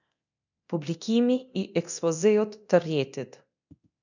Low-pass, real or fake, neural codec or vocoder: 7.2 kHz; fake; codec, 24 kHz, 0.9 kbps, DualCodec